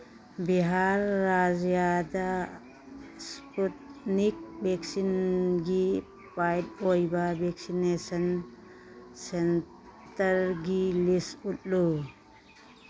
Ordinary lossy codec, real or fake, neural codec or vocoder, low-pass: none; real; none; none